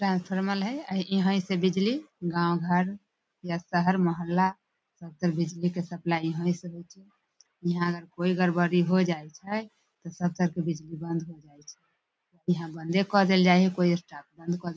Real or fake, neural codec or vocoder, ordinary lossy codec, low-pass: real; none; none; none